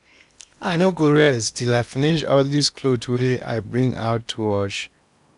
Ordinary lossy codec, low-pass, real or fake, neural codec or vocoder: none; 10.8 kHz; fake; codec, 16 kHz in and 24 kHz out, 0.8 kbps, FocalCodec, streaming, 65536 codes